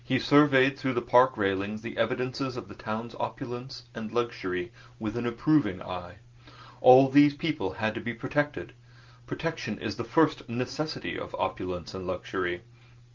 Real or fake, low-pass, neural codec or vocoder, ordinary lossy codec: real; 7.2 kHz; none; Opus, 24 kbps